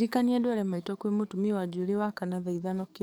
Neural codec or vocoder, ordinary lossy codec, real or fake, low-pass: codec, 44.1 kHz, 7.8 kbps, DAC; none; fake; none